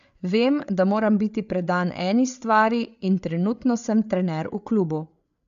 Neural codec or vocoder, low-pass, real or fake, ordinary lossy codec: codec, 16 kHz, 8 kbps, FreqCodec, larger model; 7.2 kHz; fake; none